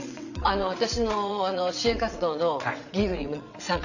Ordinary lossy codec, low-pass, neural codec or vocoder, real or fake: none; 7.2 kHz; vocoder, 22.05 kHz, 80 mel bands, WaveNeXt; fake